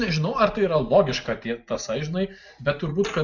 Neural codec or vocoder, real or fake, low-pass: none; real; 7.2 kHz